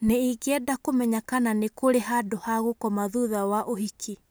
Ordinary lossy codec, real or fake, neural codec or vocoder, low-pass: none; real; none; none